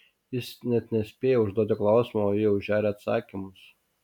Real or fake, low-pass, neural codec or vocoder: real; 19.8 kHz; none